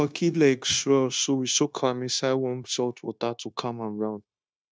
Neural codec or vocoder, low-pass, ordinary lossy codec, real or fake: codec, 16 kHz, 0.9 kbps, LongCat-Audio-Codec; none; none; fake